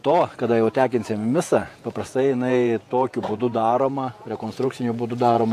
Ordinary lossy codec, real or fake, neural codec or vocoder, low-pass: AAC, 64 kbps; fake; vocoder, 48 kHz, 128 mel bands, Vocos; 14.4 kHz